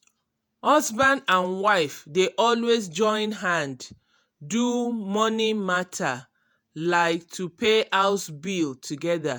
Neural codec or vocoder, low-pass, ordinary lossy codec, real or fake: vocoder, 48 kHz, 128 mel bands, Vocos; none; none; fake